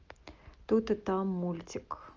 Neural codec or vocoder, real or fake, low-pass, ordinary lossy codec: none; real; 7.2 kHz; Opus, 24 kbps